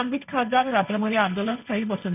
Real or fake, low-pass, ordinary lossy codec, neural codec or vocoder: fake; 3.6 kHz; none; codec, 16 kHz, 1.1 kbps, Voila-Tokenizer